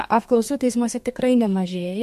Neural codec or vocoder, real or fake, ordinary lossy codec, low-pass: codec, 32 kHz, 1.9 kbps, SNAC; fake; MP3, 64 kbps; 14.4 kHz